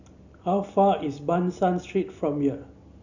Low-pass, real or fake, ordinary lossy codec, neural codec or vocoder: 7.2 kHz; real; none; none